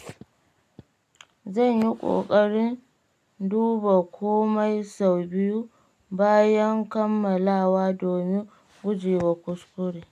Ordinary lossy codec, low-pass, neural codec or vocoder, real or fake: none; 14.4 kHz; none; real